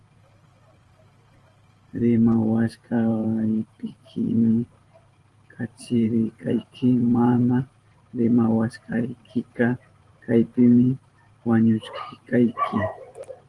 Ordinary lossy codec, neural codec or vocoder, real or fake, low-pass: Opus, 24 kbps; vocoder, 44.1 kHz, 128 mel bands every 512 samples, BigVGAN v2; fake; 10.8 kHz